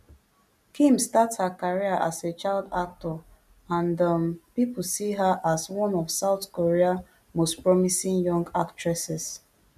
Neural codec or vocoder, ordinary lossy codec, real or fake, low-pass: none; none; real; 14.4 kHz